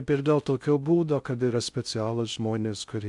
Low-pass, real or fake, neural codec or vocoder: 10.8 kHz; fake; codec, 16 kHz in and 24 kHz out, 0.6 kbps, FocalCodec, streaming, 2048 codes